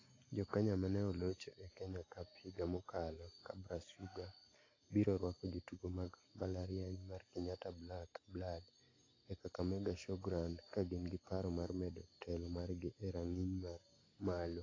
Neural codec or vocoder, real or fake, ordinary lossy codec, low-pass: none; real; AAC, 32 kbps; 7.2 kHz